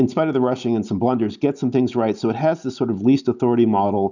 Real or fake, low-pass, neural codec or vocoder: real; 7.2 kHz; none